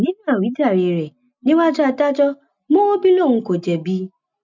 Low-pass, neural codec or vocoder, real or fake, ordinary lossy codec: 7.2 kHz; none; real; none